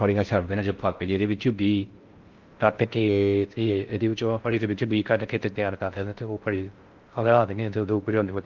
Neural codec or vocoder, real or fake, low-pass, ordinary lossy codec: codec, 16 kHz in and 24 kHz out, 0.6 kbps, FocalCodec, streaming, 4096 codes; fake; 7.2 kHz; Opus, 24 kbps